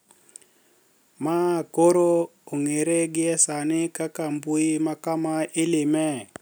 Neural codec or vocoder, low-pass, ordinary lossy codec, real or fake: none; none; none; real